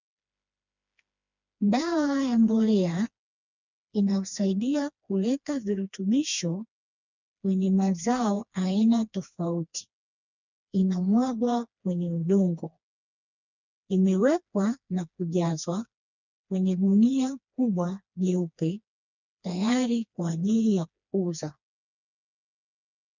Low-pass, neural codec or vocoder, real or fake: 7.2 kHz; codec, 16 kHz, 2 kbps, FreqCodec, smaller model; fake